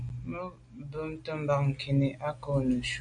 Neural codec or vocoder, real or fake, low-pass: none; real; 9.9 kHz